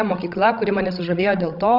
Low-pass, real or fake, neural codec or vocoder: 5.4 kHz; fake; codec, 16 kHz, 16 kbps, FreqCodec, larger model